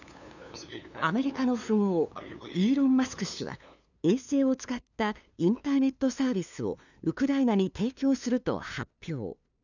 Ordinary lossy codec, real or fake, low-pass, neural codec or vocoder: none; fake; 7.2 kHz; codec, 16 kHz, 2 kbps, FunCodec, trained on LibriTTS, 25 frames a second